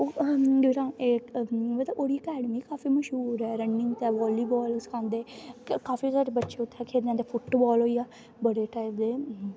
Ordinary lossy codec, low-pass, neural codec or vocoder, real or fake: none; none; none; real